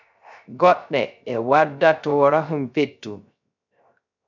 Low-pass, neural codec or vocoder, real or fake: 7.2 kHz; codec, 16 kHz, 0.3 kbps, FocalCodec; fake